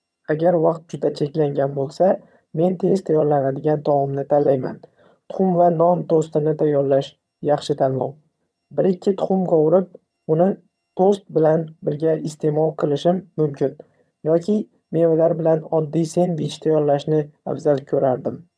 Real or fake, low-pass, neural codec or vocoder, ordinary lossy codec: fake; none; vocoder, 22.05 kHz, 80 mel bands, HiFi-GAN; none